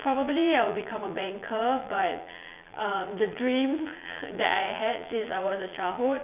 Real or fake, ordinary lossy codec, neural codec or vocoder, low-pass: fake; none; vocoder, 22.05 kHz, 80 mel bands, Vocos; 3.6 kHz